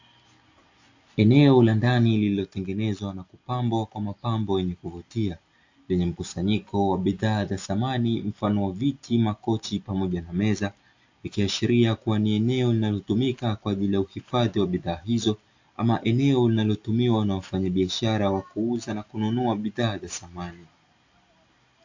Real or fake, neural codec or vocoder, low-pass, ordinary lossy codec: real; none; 7.2 kHz; AAC, 48 kbps